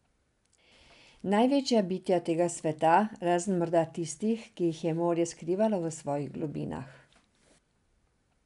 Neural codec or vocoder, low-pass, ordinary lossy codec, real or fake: none; 10.8 kHz; none; real